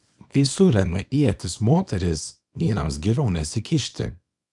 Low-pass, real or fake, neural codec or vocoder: 10.8 kHz; fake; codec, 24 kHz, 0.9 kbps, WavTokenizer, small release